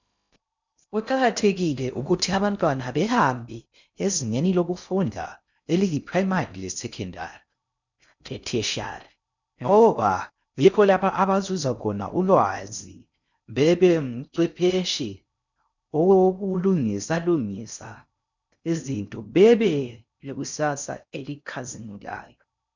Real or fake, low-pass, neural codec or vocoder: fake; 7.2 kHz; codec, 16 kHz in and 24 kHz out, 0.6 kbps, FocalCodec, streaming, 2048 codes